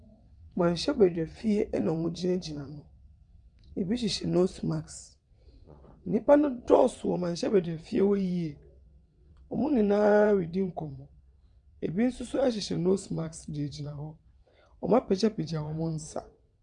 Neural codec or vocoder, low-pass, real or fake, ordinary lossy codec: vocoder, 22.05 kHz, 80 mel bands, WaveNeXt; 9.9 kHz; fake; MP3, 96 kbps